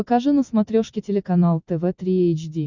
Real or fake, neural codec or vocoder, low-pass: real; none; 7.2 kHz